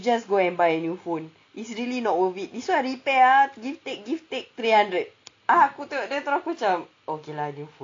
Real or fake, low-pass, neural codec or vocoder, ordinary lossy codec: real; 7.2 kHz; none; AAC, 48 kbps